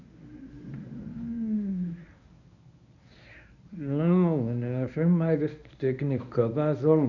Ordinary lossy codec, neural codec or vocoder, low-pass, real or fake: none; codec, 24 kHz, 0.9 kbps, WavTokenizer, medium speech release version 1; 7.2 kHz; fake